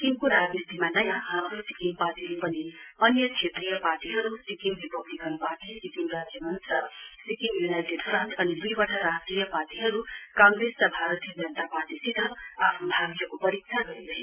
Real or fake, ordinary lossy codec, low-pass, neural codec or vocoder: real; Opus, 64 kbps; 3.6 kHz; none